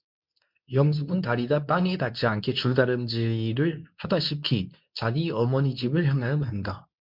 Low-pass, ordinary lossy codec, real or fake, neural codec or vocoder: 5.4 kHz; MP3, 48 kbps; fake; codec, 24 kHz, 0.9 kbps, WavTokenizer, medium speech release version 2